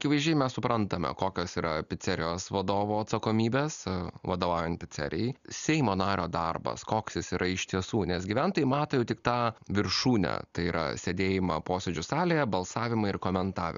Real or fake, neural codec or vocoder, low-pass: real; none; 7.2 kHz